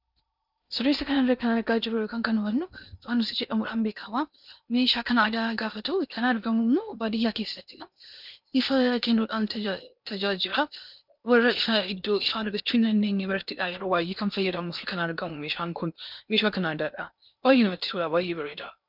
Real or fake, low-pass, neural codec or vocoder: fake; 5.4 kHz; codec, 16 kHz in and 24 kHz out, 0.8 kbps, FocalCodec, streaming, 65536 codes